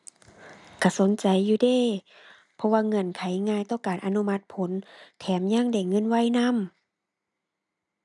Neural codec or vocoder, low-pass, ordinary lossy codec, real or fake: none; 10.8 kHz; none; real